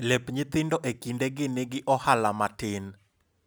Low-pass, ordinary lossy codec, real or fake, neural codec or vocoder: none; none; real; none